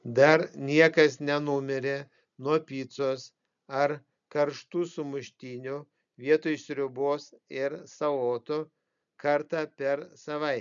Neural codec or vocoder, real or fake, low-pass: none; real; 7.2 kHz